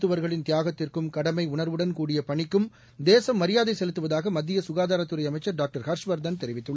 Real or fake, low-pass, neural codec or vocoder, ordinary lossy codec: real; none; none; none